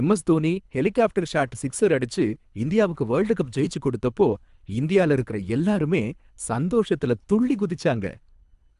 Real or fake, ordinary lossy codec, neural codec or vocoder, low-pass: fake; none; codec, 24 kHz, 3 kbps, HILCodec; 10.8 kHz